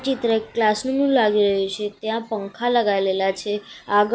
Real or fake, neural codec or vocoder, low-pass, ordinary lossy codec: real; none; none; none